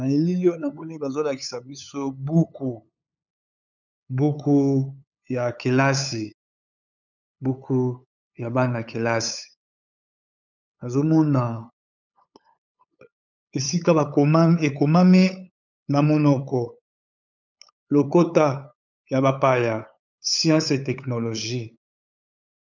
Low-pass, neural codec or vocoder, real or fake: 7.2 kHz; codec, 16 kHz, 8 kbps, FunCodec, trained on LibriTTS, 25 frames a second; fake